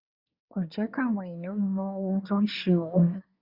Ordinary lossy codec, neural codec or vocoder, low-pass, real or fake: Opus, 64 kbps; codec, 24 kHz, 1 kbps, SNAC; 5.4 kHz; fake